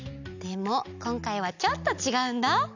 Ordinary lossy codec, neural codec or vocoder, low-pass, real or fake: none; none; 7.2 kHz; real